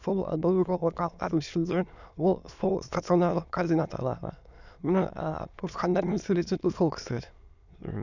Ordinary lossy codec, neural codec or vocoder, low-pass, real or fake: none; autoencoder, 22.05 kHz, a latent of 192 numbers a frame, VITS, trained on many speakers; 7.2 kHz; fake